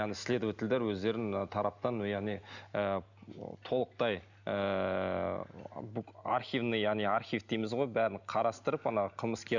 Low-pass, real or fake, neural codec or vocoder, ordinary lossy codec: 7.2 kHz; real; none; none